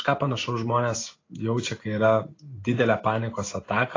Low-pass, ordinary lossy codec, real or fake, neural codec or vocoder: 7.2 kHz; AAC, 32 kbps; real; none